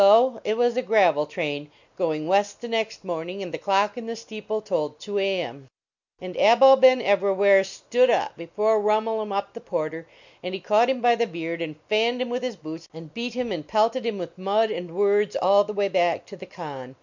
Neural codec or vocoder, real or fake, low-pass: none; real; 7.2 kHz